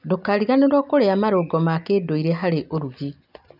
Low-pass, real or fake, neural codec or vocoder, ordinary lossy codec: 5.4 kHz; real; none; none